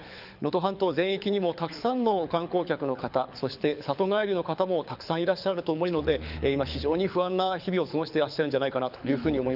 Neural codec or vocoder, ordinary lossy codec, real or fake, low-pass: codec, 24 kHz, 6 kbps, HILCodec; none; fake; 5.4 kHz